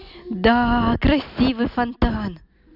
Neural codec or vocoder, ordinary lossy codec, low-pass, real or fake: none; none; 5.4 kHz; real